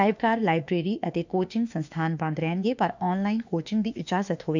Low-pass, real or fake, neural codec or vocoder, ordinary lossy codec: 7.2 kHz; fake; autoencoder, 48 kHz, 32 numbers a frame, DAC-VAE, trained on Japanese speech; none